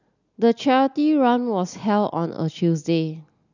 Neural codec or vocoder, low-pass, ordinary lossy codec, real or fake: none; 7.2 kHz; none; real